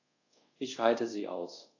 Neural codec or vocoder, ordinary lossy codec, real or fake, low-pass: codec, 24 kHz, 0.5 kbps, DualCodec; AAC, 48 kbps; fake; 7.2 kHz